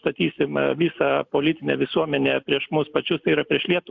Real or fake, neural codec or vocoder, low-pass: real; none; 7.2 kHz